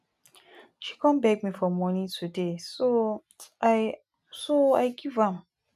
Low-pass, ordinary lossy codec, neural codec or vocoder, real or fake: 14.4 kHz; none; none; real